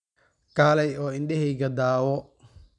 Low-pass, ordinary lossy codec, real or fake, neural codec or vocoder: 10.8 kHz; none; real; none